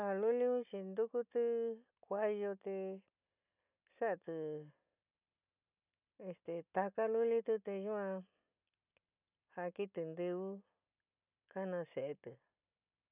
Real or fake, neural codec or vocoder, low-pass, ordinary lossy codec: real; none; 3.6 kHz; none